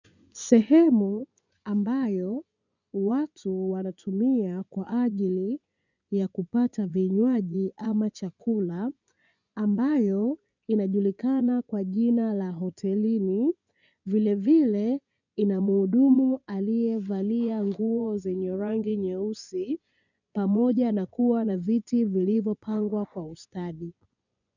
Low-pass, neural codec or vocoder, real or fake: 7.2 kHz; vocoder, 24 kHz, 100 mel bands, Vocos; fake